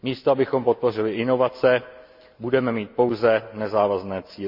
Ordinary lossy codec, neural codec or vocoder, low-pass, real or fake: MP3, 48 kbps; none; 5.4 kHz; real